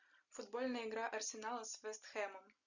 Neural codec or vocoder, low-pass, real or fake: none; 7.2 kHz; real